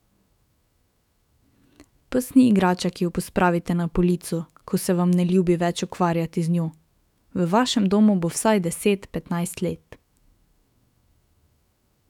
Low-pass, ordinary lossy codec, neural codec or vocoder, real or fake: 19.8 kHz; none; autoencoder, 48 kHz, 128 numbers a frame, DAC-VAE, trained on Japanese speech; fake